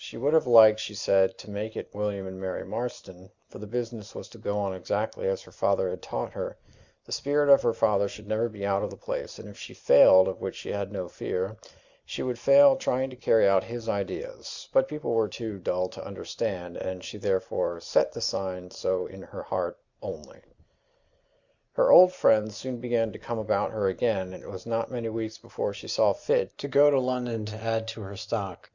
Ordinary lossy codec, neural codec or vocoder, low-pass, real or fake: Opus, 64 kbps; none; 7.2 kHz; real